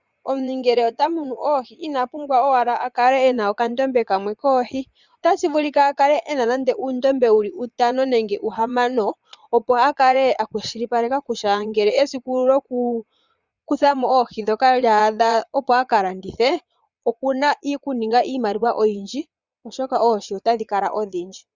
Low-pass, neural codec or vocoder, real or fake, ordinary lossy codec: 7.2 kHz; vocoder, 22.05 kHz, 80 mel bands, Vocos; fake; Opus, 64 kbps